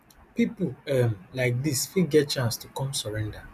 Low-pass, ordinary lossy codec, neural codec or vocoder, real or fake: 14.4 kHz; none; none; real